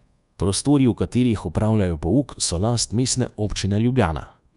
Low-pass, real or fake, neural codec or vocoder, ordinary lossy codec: 10.8 kHz; fake; codec, 24 kHz, 1.2 kbps, DualCodec; none